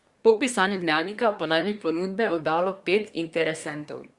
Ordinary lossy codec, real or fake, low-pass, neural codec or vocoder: Opus, 64 kbps; fake; 10.8 kHz; codec, 24 kHz, 1 kbps, SNAC